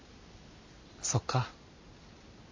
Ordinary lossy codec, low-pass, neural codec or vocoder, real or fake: MP3, 48 kbps; 7.2 kHz; none; real